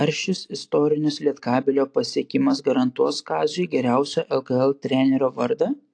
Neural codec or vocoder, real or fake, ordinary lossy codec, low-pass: none; real; AAC, 48 kbps; 9.9 kHz